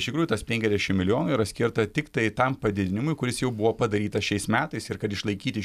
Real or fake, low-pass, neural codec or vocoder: real; 14.4 kHz; none